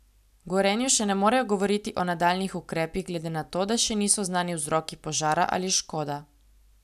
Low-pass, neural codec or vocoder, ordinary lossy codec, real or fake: 14.4 kHz; none; none; real